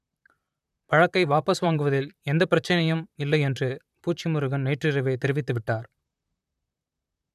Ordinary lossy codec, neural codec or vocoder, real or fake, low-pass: none; vocoder, 44.1 kHz, 128 mel bands, Pupu-Vocoder; fake; 14.4 kHz